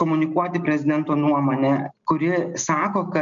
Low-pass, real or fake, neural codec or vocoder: 7.2 kHz; real; none